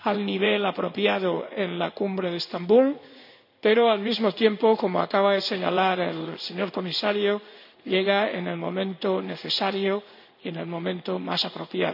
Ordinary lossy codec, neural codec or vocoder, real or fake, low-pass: none; codec, 16 kHz in and 24 kHz out, 1 kbps, XY-Tokenizer; fake; 5.4 kHz